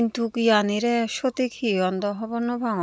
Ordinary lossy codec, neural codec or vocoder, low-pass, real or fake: none; none; none; real